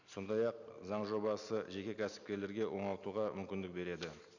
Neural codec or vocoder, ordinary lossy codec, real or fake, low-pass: none; none; real; 7.2 kHz